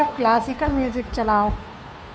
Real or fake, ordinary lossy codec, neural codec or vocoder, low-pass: fake; none; codec, 16 kHz, 2 kbps, FunCodec, trained on Chinese and English, 25 frames a second; none